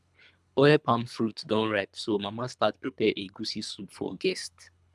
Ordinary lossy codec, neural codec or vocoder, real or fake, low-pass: none; codec, 24 kHz, 3 kbps, HILCodec; fake; none